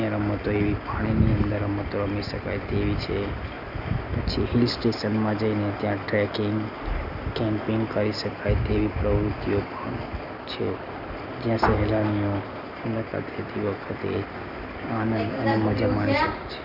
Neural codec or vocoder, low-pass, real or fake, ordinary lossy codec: none; 5.4 kHz; real; none